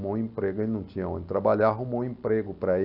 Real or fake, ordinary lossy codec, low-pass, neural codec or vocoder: real; none; 5.4 kHz; none